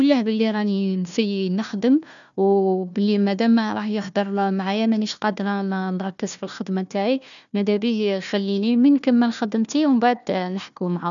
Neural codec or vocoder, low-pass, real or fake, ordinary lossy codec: codec, 16 kHz, 1 kbps, FunCodec, trained on Chinese and English, 50 frames a second; 7.2 kHz; fake; none